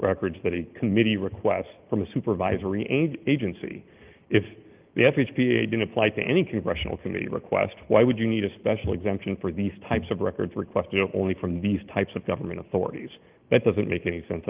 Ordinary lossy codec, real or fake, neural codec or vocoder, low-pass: Opus, 32 kbps; real; none; 3.6 kHz